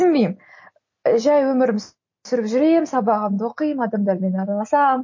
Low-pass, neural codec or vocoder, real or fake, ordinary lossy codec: 7.2 kHz; none; real; MP3, 32 kbps